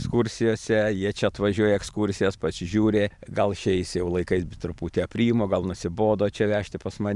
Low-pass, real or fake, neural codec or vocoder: 10.8 kHz; fake; vocoder, 44.1 kHz, 128 mel bands every 512 samples, BigVGAN v2